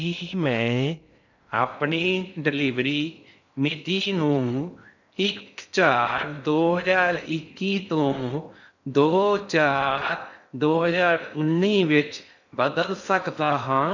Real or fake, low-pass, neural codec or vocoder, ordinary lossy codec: fake; 7.2 kHz; codec, 16 kHz in and 24 kHz out, 0.6 kbps, FocalCodec, streaming, 2048 codes; none